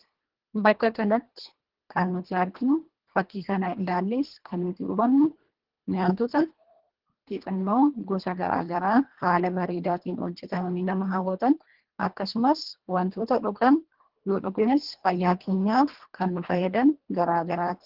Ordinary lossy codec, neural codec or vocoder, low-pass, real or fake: Opus, 16 kbps; codec, 24 kHz, 1.5 kbps, HILCodec; 5.4 kHz; fake